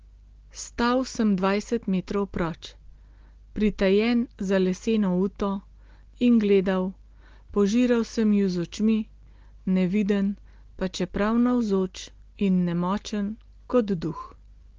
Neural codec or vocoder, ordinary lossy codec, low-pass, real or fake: none; Opus, 16 kbps; 7.2 kHz; real